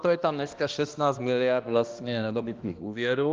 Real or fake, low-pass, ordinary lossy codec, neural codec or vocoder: fake; 7.2 kHz; Opus, 32 kbps; codec, 16 kHz, 1 kbps, X-Codec, HuBERT features, trained on balanced general audio